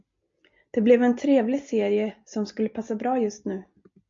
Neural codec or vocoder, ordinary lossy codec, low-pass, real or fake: none; MP3, 48 kbps; 7.2 kHz; real